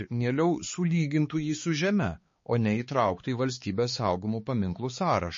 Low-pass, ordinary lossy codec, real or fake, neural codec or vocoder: 7.2 kHz; MP3, 32 kbps; fake; codec, 16 kHz, 4 kbps, X-Codec, HuBERT features, trained on balanced general audio